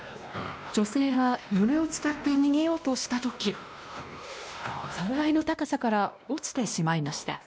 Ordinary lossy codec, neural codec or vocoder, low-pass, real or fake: none; codec, 16 kHz, 1 kbps, X-Codec, WavLM features, trained on Multilingual LibriSpeech; none; fake